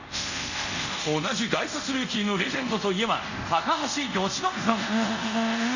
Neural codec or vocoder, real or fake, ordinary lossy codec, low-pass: codec, 24 kHz, 0.5 kbps, DualCodec; fake; none; 7.2 kHz